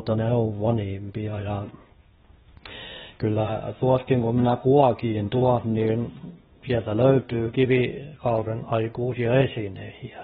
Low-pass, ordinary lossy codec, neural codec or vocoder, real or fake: 7.2 kHz; AAC, 16 kbps; codec, 16 kHz, 0.8 kbps, ZipCodec; fake